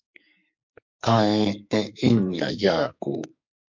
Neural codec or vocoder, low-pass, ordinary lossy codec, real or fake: codec, 44.1 kHz, 2.6 kbps, SNAC; 7.2 kHz; MP3, 48 kbps; fake